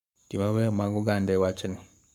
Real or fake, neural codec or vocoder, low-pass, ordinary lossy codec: fake; codec, 44.1 kHz, 7.8 kbps, Pupu-Codec; 19.8 kHz; none